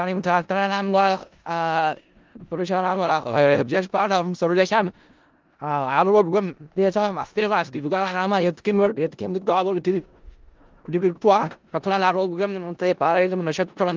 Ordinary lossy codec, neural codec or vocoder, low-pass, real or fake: Opus, 32 kbps; codec, 16 kHz in and 24 kHz out, 0.4 kbps, LongCat-Audio-Codec, four codebook decoder; 7.2 kHz; fake